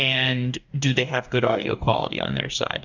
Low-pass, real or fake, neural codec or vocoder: 7.2 kHz; fake; codec, 44.1 kHz, 2.6 kbps, DAC